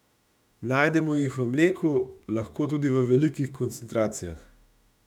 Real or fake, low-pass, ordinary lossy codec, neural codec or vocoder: fake; 19.8 kHz; none; autoencoder, 48 kHz, 32 numbers a frame, DAC-VAE, trained on Japanese speech